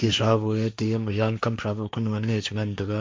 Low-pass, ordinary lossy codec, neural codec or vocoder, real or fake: none; none; codec, 16 kHz, 1.1 kbps, Voila-Tokenizer; fake